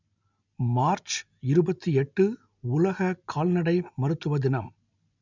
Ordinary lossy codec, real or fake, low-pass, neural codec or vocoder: none; real; 7.2 kHz; none